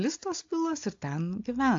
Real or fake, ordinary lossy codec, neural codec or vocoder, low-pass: real; AAC, 48 kbps; none; 7.2 kHz